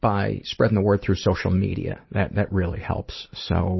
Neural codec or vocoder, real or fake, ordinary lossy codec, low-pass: none; real; MP3, 24 kbps; 7.2 kHz